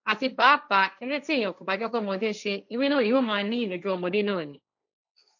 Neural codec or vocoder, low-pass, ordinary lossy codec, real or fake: codec, 16 kHz, 1.1 kbps, Voila-Tokenizer; 7.2 kHz; none; fake